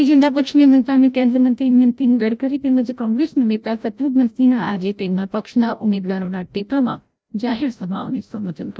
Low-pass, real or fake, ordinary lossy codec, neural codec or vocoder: none; fake; none; codec, 16 kHz, 0.5 kbps, FreqCodec, larger model